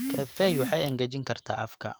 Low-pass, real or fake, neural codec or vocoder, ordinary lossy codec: none; fake; codec, 44.1 kHz, 7.8 kbps, DAC; none